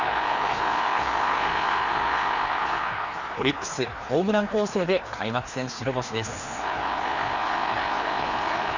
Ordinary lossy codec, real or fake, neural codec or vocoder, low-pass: Opus, 64 kbps; fake; codec, 16 kHz, 2 kbps, FreqCodec, larger model; 7.2 kHz